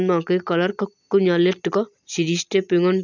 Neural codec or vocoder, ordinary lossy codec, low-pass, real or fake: none; none; 7.2 kHz; real